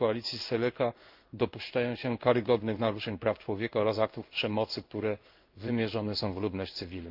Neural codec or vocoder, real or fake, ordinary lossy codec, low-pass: codec, 16 kHz in and 24 kHz out, 1 kbps, XY-Tokenizer; fake; Opus, 32 kbps; 5.4 kHz